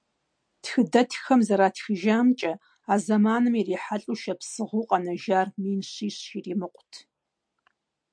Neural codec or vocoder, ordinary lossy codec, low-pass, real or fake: none; MP3, 64 kbps; 9.9 kHz; real